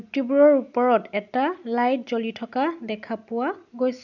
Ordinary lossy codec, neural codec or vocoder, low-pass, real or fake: none; none; 7.2 kHz; real